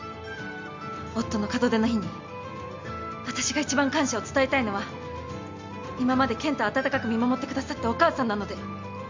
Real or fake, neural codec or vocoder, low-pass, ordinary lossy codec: real; none; 7.2 kHz; MP3, 64 kbps